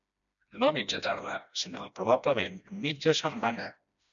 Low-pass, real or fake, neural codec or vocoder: 7.2 kHz; fake; codec, 16 kHz, 1 kbps, FreqCodec, smaller model